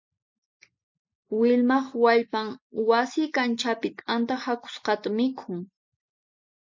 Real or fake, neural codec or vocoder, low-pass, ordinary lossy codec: real; none; 7.2 kHz; MP3, 64 kbps